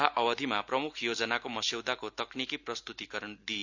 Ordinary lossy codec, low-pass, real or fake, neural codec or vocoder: none; 7.2 kHz; real; none